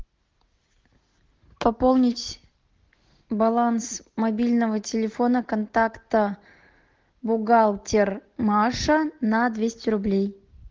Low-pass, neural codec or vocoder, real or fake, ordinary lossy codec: 7.2 kHz; none; real; Opus, 16 kbps